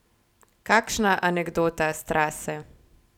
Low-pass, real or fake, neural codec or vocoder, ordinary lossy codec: 19.8 kHz; real; none; none